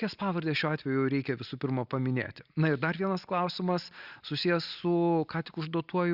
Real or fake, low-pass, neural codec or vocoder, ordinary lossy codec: real; 5.4 kHz; none; Opus, 64 kbps